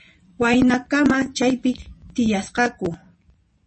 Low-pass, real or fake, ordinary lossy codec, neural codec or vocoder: 10.8 kHz; fake; MP3, 32 kbps; vocoder, 24 kHz, 100 mel bands, Vocos